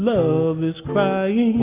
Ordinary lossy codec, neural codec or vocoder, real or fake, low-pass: Opus, 64 kbps; none; real; 3.6 kHz